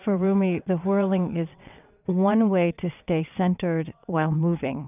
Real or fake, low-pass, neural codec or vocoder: fake; 3.6 kHz; vocoder, 22.05 kHz, 80 mel bands, WaveNeXt